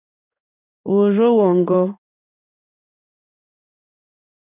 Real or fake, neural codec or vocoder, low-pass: fake; codec, 16 kHz in and 24 kHz out, 1 kbps, XY-Tokenizer; 3.6 kHz